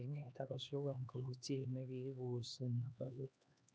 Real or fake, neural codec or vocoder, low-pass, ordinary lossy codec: fake; codec, 16 kHz, 2 kbps, X-Codec, HuBERT features, trained on LibriSpeech; none; none